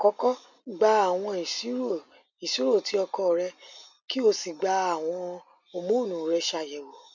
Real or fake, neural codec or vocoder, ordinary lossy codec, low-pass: real; none; none; 7.2 kHz